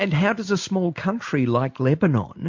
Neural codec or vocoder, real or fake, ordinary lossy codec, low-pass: none; real; MP3, 48 kbps; 7.2 kHz